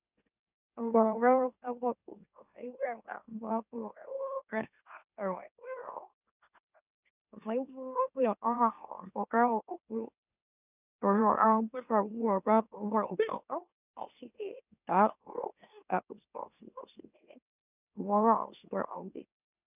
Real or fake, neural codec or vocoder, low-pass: fake; autoencoder, 44.1 kHz, a latent of 192 numbers a frame, MeloTTS; 3.6 kHz